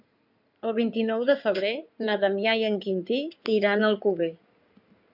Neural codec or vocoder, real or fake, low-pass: codec, 16 kHz in and 24 kHz out, 2.2 kbps, FireRedTTS-2 codec; fake; 5.4 kHz